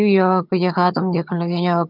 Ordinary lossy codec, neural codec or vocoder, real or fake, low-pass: none; vocoder, 22.05 kHz, 80 mel bands, HiFi-GAN; fake; 5.4 kHz